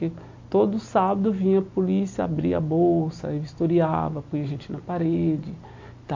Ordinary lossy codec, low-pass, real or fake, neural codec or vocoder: MP3, 48 kbps; 7.2 kHz; real; none